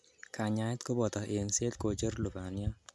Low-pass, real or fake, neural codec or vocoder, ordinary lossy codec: none; real; none; none